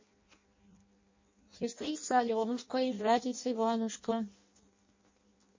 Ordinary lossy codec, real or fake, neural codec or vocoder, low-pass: MP3, 32 kbps; fake; codec, 16 kHz in and 24 kHz out, 0.6 kbps, FireRedTTS-2 codec; 7.2 kHz